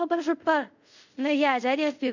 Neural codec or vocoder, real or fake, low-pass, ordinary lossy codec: codec, 24 kHz, 0.5 kbps, DualCodec; fake; 7.2 kHz; none